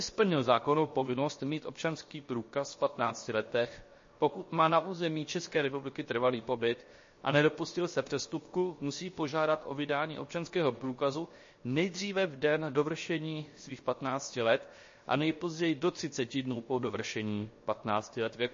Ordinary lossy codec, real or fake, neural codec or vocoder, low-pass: MP3, 32 kbps; fake; codec, 16 kHz, 0.7 kbps, FocalCodec; 7.2 kHz